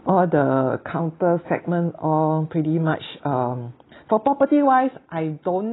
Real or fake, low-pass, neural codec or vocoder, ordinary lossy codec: real; 7.2 kHz; none; AAC, 16 kbps